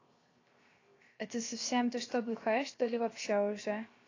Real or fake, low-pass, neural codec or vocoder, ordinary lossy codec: fake; 7.2 kHz; codec, 16 kHz, 0.7 kbps, FocalCodec; AAC, 32 kbps